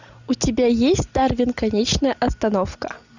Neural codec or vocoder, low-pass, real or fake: none; 7.2 kHz; real